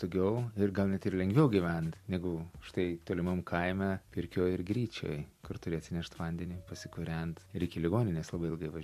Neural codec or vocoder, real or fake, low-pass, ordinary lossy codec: none; real; 14.4 kHz; MP3, 64 kbps